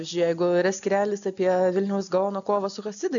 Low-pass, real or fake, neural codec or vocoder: 7.2 kHz; real; none